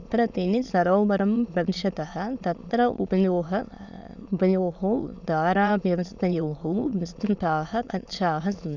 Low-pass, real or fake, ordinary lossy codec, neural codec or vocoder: 7.2 kHz; fake; none; autoencoder, 22.05 kHz, a latent of 192 numbers a frame, VITS, trained on many speakers